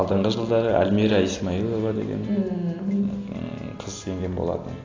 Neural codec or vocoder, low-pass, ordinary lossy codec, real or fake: none; 7.2 kHz; AAC, 32 kbps; real